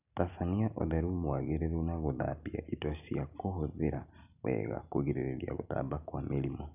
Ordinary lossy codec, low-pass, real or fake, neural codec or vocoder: none; 3.6 kHz; real; none